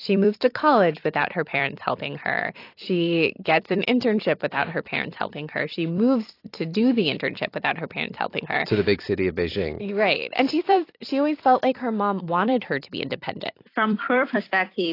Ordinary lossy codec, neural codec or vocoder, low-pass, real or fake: AAC, 32 kbps; vocoder, 44.1 kHz, 128 mel bands every 256 samples, BigVGAN v2; 5.4 kHz; fake